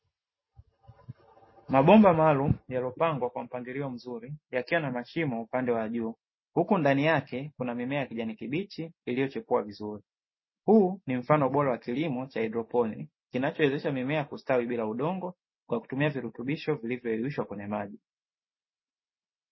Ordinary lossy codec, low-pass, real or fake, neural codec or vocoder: MP3, 24 kbps; 7.2 kHz; real; none